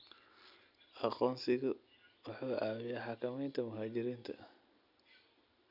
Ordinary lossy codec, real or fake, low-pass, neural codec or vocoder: none; real; 5.4 kHz; none